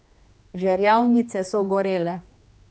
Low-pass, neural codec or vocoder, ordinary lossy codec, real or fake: none; codec, 16 kHz, 2 kbps, X-Codec, HuBERT features, trained on general audio; none; fake